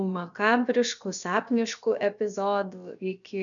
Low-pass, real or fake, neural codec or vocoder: 7.2 kHz; fake; codec, 16 kHz, about 1 kbps, DyCAST, with the encoder's durations